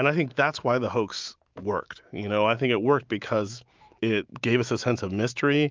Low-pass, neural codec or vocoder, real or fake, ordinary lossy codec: 7.2 kHz; autoencoder, 48 kHz, 128 numbers a frame, DAC-VAE, trained on Japanese speech; fake; Opus, 24 kbps